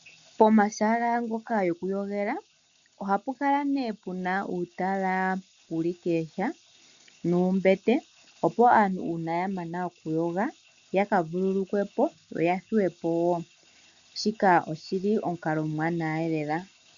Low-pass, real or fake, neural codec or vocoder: 7.2 kHz; real; none